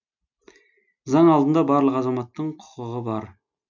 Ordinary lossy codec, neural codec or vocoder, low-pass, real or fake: none; none; 7.2 kHz; real